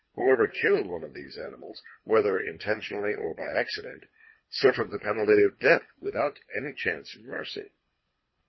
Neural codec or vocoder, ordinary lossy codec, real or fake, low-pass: codec, 24 kHz, 3 kbps, HILCodec; MP3, 24 kbps; fake; 7.2 kHz